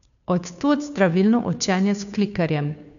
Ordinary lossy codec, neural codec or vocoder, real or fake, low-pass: none; codec, 16 kHz, 2 kbps, FunCodec, trained on Chinese and English, 25 frames a second; fake; 7.2 kHz